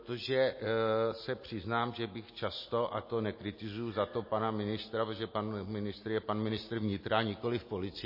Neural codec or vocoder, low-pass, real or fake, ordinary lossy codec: none; 5.4 kHz; real; MP3, 24 kbps